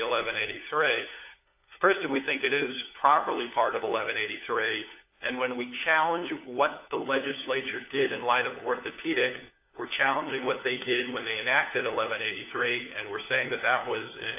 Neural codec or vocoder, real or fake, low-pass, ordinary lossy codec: codec, 16 kHz, 4 kbps, FunCodec, trained on LibriTTS, 50 frames a second; fake; 3.6 kHz; AAC, 32 kbps